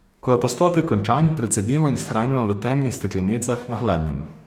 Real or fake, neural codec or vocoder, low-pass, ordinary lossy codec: fake; codec, 44.1 kHz, 2.6 kbps, DAC; 19.8 kHz; none